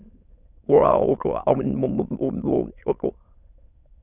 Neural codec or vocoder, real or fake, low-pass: autoencoder, 22.05 kHz, a latent of 192 numbers a frame, VITS, trained on many speakers; fake; 3.6 kHz